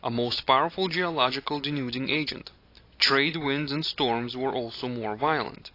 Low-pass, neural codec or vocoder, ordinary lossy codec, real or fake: 5.4 kHz; none; AAC, 32 kbps; real